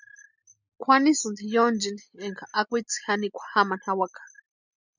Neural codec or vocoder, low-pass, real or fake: none; 7.2 kHz; real